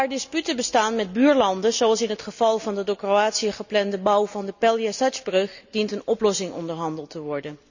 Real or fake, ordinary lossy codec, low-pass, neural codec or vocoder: real; none; 7.2 kHz; none